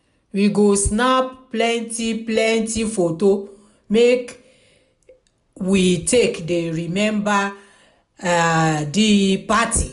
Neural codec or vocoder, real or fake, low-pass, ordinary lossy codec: none; real; 10.8 kHz; none